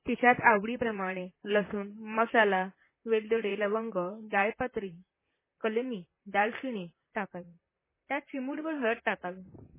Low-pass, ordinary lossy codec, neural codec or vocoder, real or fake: 3.6 kHz; MP3, 16 kbps; vocoder, 44.1 kHz, 128 mel bands, Pupu-Vocoder; fake